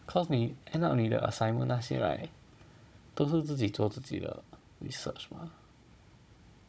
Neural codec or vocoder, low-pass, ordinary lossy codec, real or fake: codec, 16 kHz, 16 kbps, FunCodec, trained on Chinese and English, 50 frames a second; none; none; fake